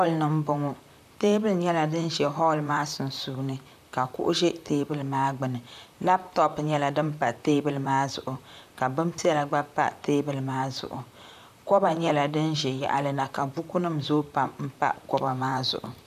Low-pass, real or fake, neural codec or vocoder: 14.4 kHz; fake; vocoder, 44.1 kHz, 128 mel bands, Pupu-Vocoder